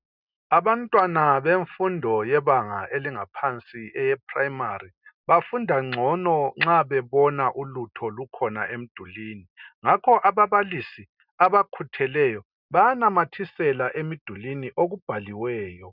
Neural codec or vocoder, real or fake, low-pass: none; real; 5.4 kHz